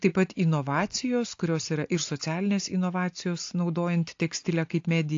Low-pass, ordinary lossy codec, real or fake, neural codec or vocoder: 7.2 kHz; AAC, 48 kbps; real; none